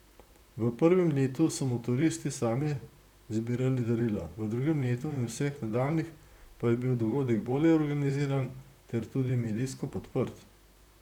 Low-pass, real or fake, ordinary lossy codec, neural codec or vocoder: 19.8 kHz; fake; none; vocoder, 44.1 kHz, 128 mel bands, Pupu-Vocoder